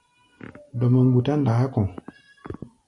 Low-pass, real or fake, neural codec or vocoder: 10.8 kHz; real; none